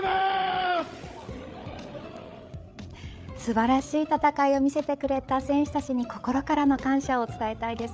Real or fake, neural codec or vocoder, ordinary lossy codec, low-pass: fake; codec, 16 kHz, 8 kbps, FreqCodec, larger model; none; none